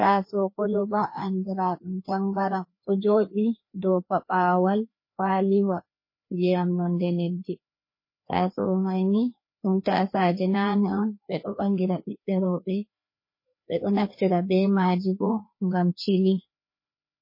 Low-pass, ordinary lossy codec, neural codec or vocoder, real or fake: 5.4 kHz; MP3, 24 kbps; codec, 16 kHz, 2 kbps, FreqCodec, larger model; fake